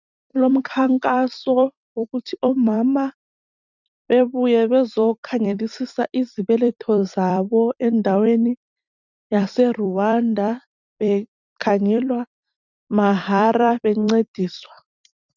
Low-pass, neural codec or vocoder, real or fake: 7.2 kHz; vocoder, 44.1 kHz, 128 mel bands every 256 samples, BigVGAN v2; fake